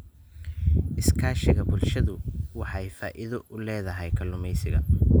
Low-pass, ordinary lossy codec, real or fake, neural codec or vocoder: none; none; real; none